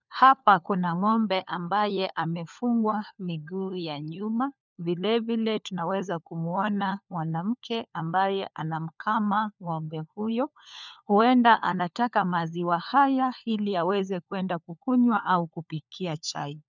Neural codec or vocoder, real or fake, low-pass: codec, 16 kHz, 4 kbps, FunCodec, trained on LibriTTS, 50 frames a second; fake; 7.2 kHz